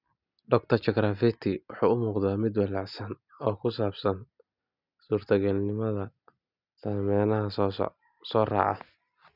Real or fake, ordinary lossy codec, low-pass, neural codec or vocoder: real; none; 5.4 kHz; none